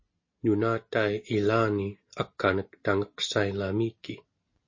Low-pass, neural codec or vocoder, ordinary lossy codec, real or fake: 7.2 kHz; none; MP3, 32 kbps; real